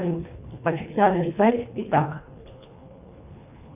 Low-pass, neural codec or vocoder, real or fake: 3.6 kHz; codec, 24 kHz, 1.5 kbps, HILCodec; fake